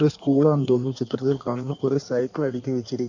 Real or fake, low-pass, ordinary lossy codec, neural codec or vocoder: fake; 7.2 kHz; none; codec, 16 kHz in and 24 kHz out, 1.1 kbps, FireRedTTS-2 codec